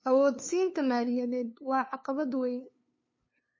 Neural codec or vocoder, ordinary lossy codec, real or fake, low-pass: codec, 16 kHz, 2 kbps, FunCodec, trained on LibriTTS, 25 frames a second; MP3, 32 kbps; fake; 7.2 kHz